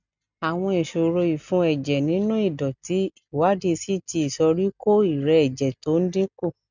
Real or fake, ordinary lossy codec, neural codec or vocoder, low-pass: real; none; none; 7.2 kHz